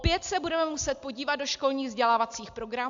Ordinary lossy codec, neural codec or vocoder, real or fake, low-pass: AAC, 64 kbps; none; real; 7.2 kHz